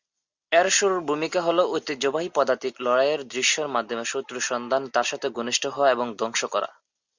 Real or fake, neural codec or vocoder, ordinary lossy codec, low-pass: real; none; Opus, 64 kbps; 7.2 kHz